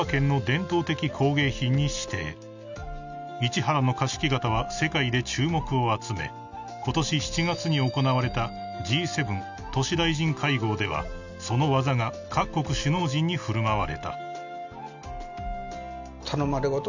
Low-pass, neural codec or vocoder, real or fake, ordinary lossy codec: 7.2 kHz; none; real; none